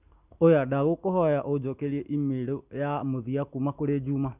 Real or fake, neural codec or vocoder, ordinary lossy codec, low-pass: real; none; none; 3.6 kHz